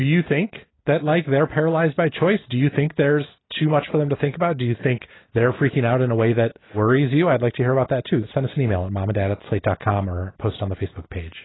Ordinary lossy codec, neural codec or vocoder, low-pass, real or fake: AAC, 16 kbps; none; 7.2 kHz; real